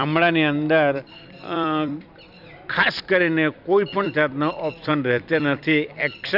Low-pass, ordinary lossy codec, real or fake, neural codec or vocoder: 5.4 kHz; none; fake; vocoder, 44.1 kHz, 128 mel bands every 256 samples, BigVGAN v2